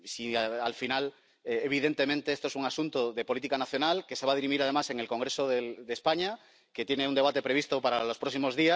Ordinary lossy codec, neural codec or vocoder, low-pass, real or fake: none; none; none; real